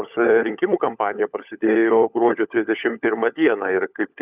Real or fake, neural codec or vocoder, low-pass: fake; codec, 16 kHz, 16 kbps, FunCodec, trained on LibriTTS, 50 frames a second; 3.6 kHz